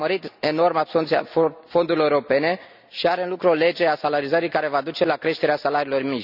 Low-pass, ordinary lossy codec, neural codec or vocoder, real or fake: 5.4 kHz; none; none; real